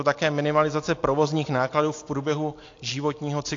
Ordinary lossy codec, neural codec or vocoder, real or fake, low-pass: AAC, 48 kbps; none; real; 7.2 kHz